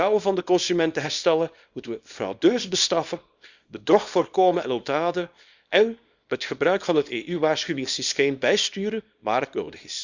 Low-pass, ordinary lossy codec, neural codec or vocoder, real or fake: 7.2 kHz; Opus, 64 kbps; codec, 24 kHz, 0.9 kbps, WavTokenizer, small release; fake